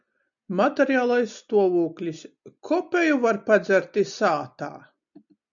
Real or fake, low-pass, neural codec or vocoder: real; 7.2 kHz; none